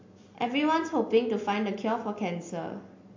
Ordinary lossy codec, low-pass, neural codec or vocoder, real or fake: MP3, 48 kbps; 7.2 kHz; none; real